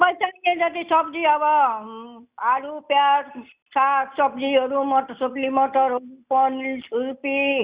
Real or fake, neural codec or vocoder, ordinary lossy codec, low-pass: real; none; Opus, 64 kbps; 3.6 kHz